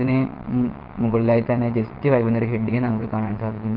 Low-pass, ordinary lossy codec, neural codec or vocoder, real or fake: 5.4 kHz; Opus, 16 kbps; vocoder, 22.05 kHz, 80 mel bands, WaveNeXt; fake